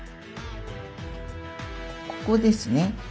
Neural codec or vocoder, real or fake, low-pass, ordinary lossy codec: none; real; none; none